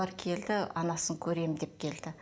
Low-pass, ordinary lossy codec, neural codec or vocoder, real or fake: none; none; none; real